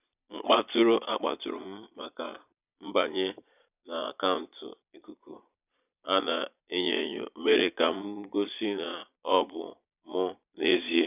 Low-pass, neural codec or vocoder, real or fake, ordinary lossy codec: 3.6 kHz; vocoder, 22.05 kHz, 80 mel bands, Vocos; fake; none